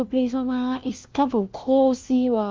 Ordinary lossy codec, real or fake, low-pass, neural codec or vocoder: Opus, 32 kbps; fake; 7.2 kHz; codec, 16 kHz, 1 kbps, FunCodec, trained on LibriTTS, 50 frames a second